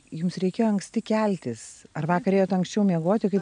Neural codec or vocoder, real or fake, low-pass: none; real; 9.9 kHz